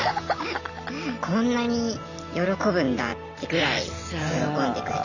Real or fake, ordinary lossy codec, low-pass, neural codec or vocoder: real; none; 7.2 kHz; none